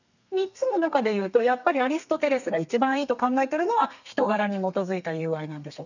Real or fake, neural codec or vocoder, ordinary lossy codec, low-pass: fake; codec, 32 kHz, 1.9 kbps, SNAC; none; 7.2 kHz